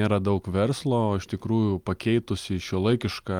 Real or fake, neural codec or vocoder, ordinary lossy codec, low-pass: real; none; Opus, 32 kbps; 19.8 kHz